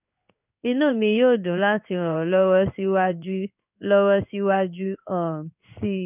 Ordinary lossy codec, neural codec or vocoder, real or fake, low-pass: none; codec, 16 kHz in and 24 kHz out, 1 kbps, XY-Tokenizer; fake; 3.6 kHz